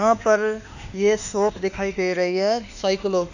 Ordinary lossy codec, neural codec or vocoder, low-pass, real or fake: none; autoencoder, 48 kHz, 32 numbers a frame, DAC-VAE, trained on Japanese speech; 7.2 kHz; fake